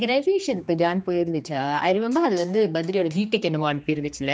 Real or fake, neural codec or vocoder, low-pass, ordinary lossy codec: fake; codec, 16 kHz, 2 kbps, X-Codec, HuBERT features, trained on general audio; none; none